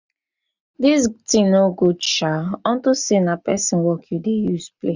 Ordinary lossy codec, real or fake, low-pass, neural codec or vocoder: none; real; 7.2 kHz; none